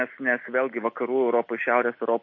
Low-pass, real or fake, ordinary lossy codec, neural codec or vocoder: 7.2 kHz; real; MP3, 32 kbps; none